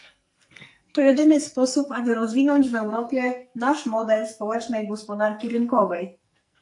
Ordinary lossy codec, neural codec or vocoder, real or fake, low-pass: AAC, 64 kbps; codec, 44.1 kHz, 2.6 kbps, SNAC; fake; 10.8 kHz